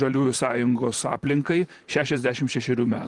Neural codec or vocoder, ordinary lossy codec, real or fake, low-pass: vocoder, 44.1 kHz, 128 mel bands, Pupu-Vocoder; Opus, 24 kbps; fake; 10.8 kHz